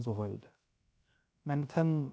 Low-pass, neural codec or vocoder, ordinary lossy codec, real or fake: none; codec, 16 kHz, 0.3 kbps, FocalCodec; none; fake